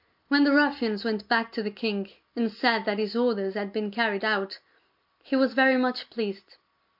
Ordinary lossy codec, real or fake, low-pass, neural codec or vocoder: MP3, 48 kbps; real; 5.4 kHz; none